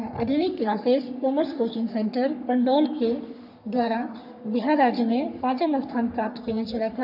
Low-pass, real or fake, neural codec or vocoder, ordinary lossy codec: 5.4 kHz; fake; codec, 44.1 kHz, 3.4 kbps, Pupu-Codec; none